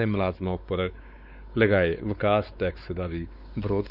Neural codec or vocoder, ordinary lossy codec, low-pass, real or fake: codec, 16 kHz, 4 kbps, X-Codec, WavLM features, trained on Multilingual LibriSpeech; Opus, 64 kbps; 5.4 kHz; fake